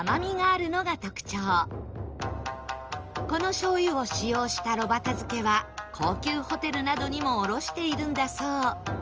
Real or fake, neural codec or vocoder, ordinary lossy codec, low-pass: real; none; Opus, 24 kbps; 7.2 kHz